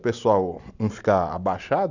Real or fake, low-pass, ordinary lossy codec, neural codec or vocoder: real; 7.2 kHz; none; none